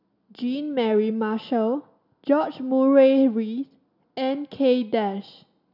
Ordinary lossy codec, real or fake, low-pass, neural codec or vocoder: none; real; 5.4 kHz; none